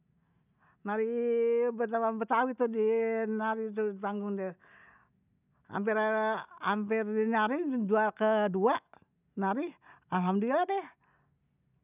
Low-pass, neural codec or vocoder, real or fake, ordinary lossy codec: 3.6 kHz; none; real; none